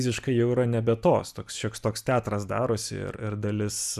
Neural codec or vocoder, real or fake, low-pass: none; real; 14.4 kHz